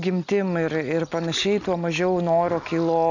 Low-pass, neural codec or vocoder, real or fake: 7.2 kHz; none; real